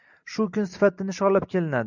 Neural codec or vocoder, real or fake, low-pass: none; real; 7.2 kHz